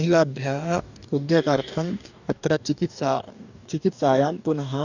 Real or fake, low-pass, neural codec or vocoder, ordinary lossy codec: fake; 7.2 kHz; codec, 44.1 kHz, 2.6 kbps, DAC; none